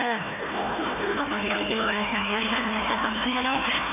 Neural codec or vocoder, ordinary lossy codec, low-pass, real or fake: codec, 16 kHz, 1 kbps, FunCodec, trained on Chinese and English, 50 frames a second; none; 3.6 kHz; fake